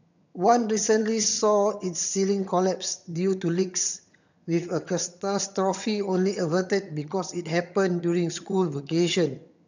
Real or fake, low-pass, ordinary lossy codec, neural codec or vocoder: fake; 7.2 kHz; none; vocoder, 22.05 kHz, 80 mel bands, HiFi-GAN